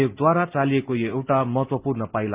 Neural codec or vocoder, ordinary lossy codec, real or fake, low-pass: none; Opus, 32 kbps; real; 3.6 kHz